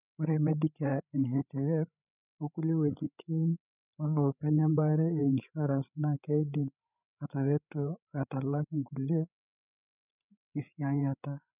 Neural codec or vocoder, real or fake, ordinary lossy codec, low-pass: codec, 16 kHz, 8 kbps, FreqCodec, larger model; fake; none; 3.6 kHz